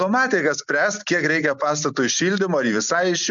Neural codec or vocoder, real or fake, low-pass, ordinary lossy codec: none; real; 7.2 kHz; MP3, 64 kbps